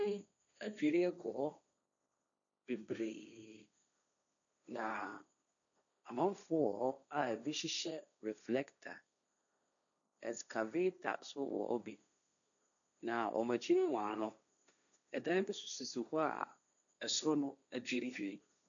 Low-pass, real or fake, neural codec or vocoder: 7.2 kHz; fake; codec, 16 kHz, 1.1 kbps, Voila-Tokenizer